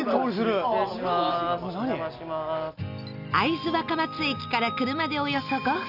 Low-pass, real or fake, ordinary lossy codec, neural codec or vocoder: 5.4 kHz; real; none; none